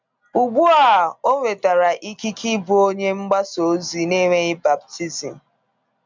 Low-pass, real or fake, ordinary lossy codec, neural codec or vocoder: 7.2 kHz; real; MP3, 64 kbps; none